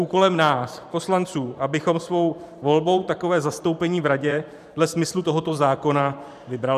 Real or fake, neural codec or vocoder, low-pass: fake; vocoder, 44.1 kHz, 128 mel bands every 512 samples, BigVGAN v2; 14.4 kHz